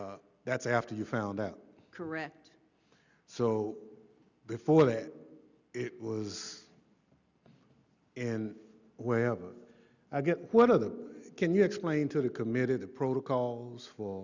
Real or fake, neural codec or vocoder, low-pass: real; none; 7.2 kHz